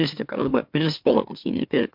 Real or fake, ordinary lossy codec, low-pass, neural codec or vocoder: fake; MP3, 48 kbps; 5.4 kHz; autoencoder, 44.1 kHz, a latent of 192 numbers a frame, MeloTTS